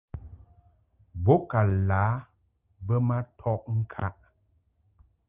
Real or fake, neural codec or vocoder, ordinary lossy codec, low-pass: real; none; Opus, 32 kbps; 3.6 kHz